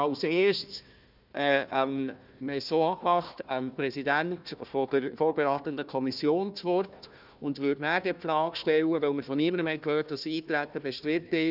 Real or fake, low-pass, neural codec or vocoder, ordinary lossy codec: fake; 5.4 kHz; codec, 16 kHz, 1 kbps, FunCodec, trained on Chinese and English, 50 frames a second; none